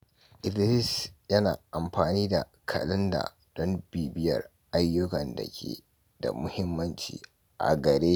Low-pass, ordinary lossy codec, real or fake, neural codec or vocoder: none; none; real; none